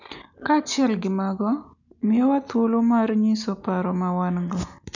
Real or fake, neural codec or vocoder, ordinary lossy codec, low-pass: real; none; none; 7.2 kHz